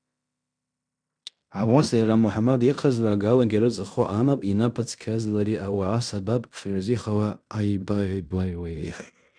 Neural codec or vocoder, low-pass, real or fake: codec, 16 kHz in and 24 kHz out, 0.9 kbps, LongCat-Audio-Codec, fine tuned four codebook decoder; 9.9 kHz; fake